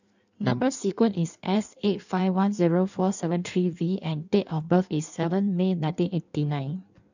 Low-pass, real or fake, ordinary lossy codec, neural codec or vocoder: 7.2 kHz; fake; none; codec, 16 kHz in and 24 kHz out, 1.1 kbps, FireRedTTS-2 codec